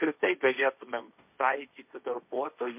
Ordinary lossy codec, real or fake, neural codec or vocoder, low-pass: MP3, 32 kbps; fake; codec, 16 kHz, 1.1 kbps, Voila-Tokenizer; 3.6 kHz